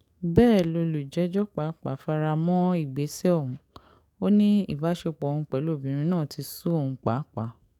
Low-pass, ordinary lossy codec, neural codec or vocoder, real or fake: 19.8 kHz; none; codec, 44.1 kHz, 7.8 kbps, DAC; fake